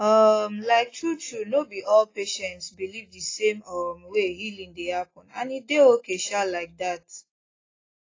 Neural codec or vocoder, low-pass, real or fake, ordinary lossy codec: none; 7.2 kHz; real; AAC, 32 kbps